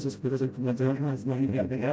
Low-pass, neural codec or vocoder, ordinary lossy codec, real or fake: none; codec, 16 kHz, 0.5 kbps, FreqCodec, smaller model; none; fake